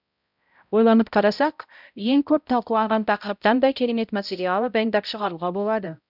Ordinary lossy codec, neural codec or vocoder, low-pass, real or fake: none; codec, 16 kHz, 0.5 kbps, X-Codec, HuBERT features, trained on balanced general audio; 5.4 kHz; fake